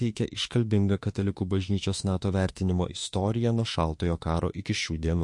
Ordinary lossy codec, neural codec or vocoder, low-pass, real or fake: MP3, 48 kbps; autoencoder, 48 kHz, 32 numbers a frame, DAC-VAE, trained on Japanese speech; 10.8 kHz; fake